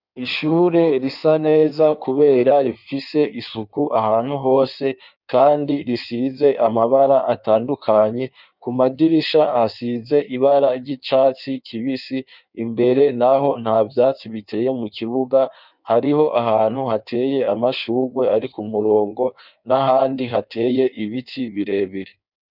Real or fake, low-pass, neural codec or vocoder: fake; 5.4 kHz; codec, 16 kHz in and 24 kHz out, 1.1 kbps, FireRedTTS-2 codec